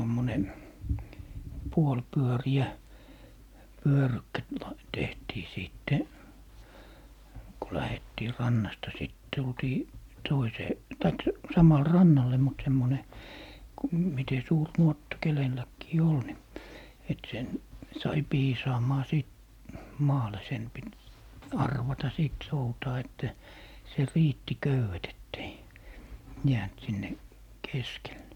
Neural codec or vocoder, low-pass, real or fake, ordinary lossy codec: none; 19.8 kHz; real; MP3, 96 kbps